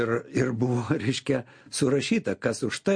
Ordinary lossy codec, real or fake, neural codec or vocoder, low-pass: MP3, 48 kbps; real; none; 9.9 kHz